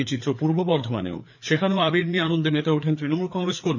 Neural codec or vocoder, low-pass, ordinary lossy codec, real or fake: codec, 16 kHz, 4 kbps, FreqCodec, larger model; 7.2 kHz; none; fake